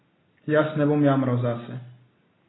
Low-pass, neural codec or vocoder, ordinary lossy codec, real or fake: 7.2 kHz; none; AAC, 16 kbps; real